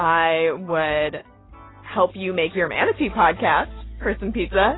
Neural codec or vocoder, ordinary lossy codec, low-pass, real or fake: none; AAC, 16 kbps; 7.2 kHz; real